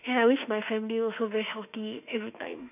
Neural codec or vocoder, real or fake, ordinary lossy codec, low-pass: autoencoder, 48 kHz, 32 numbers a frame, DAC-VAE, trained on Japanese speech; fake; none; 3.6 kHz